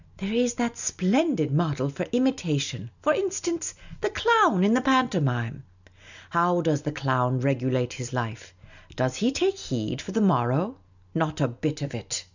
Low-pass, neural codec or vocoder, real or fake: 7.2 kHz; none; real